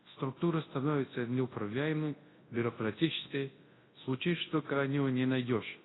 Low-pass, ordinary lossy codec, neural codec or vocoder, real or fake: 7.2 kHz; AAC, 16 kbps; codec, 24 kHz, 0.9 kbps, WavTokenizer, large speech release; fake